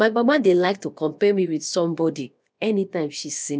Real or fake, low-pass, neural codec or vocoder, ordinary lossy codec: fake; none; codec, 16 kHz, about 1 kbps, DyCAST, with the encoder's durations; none